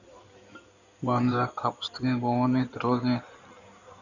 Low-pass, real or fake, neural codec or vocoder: 7.2 kHz; fake; codec, 16 kHz in and 24 kHz out, 2.2 kbps, FireRedTTS-2 codec